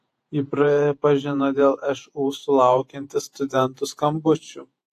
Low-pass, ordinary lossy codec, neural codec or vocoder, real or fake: 14.4 kHz; AAC, 64 kbps; vocoder, 48 kHz, 128 mel bands, Vocos; fake